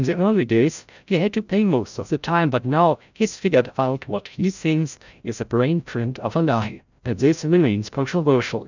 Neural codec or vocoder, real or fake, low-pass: codec, 16 kHz, 0.5 kbps, FreqCodec, larger model; fake; 7.2 kHz